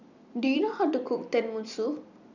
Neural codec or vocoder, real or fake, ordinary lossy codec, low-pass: vocoder, 44.1 kHz, 128 mel bands every 256 samples, BigVGAN v2; fake; none; 7.2 kHz